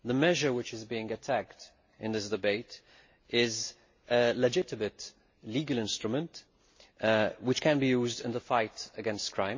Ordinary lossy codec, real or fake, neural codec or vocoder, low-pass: MP3, 32 kbps; real; none; 7.2 kHz